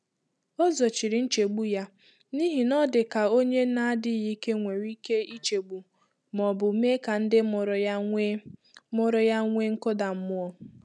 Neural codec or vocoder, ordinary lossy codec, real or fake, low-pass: none; none; real; none